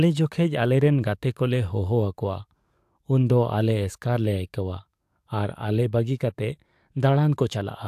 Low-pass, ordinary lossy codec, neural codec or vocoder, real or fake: 14.4 kHz; AAC, 96 kbps; codec, 44.1 kHz, 7.8 kbps, DAC; fake